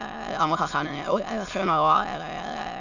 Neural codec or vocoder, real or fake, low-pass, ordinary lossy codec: autoencoder, 22.05 kHz, a latent of 192 numbers a frame, VITS, trained on many speakers; fake; 7.2 kHz; none